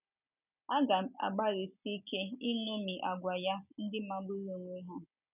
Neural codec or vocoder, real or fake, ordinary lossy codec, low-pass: none; real; none; 3.6 kHz